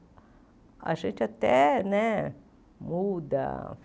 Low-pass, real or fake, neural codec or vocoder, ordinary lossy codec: none; real; none; none